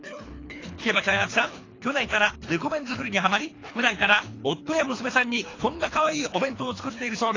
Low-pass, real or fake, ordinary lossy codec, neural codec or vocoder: 7.2 kHz; fake; AAC, 32 kbps; codec, 24 kHz, 3 kbps, HILCodec